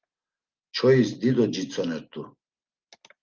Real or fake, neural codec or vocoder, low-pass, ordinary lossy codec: real; none; 7.2 kHz; Opus, 32 kbps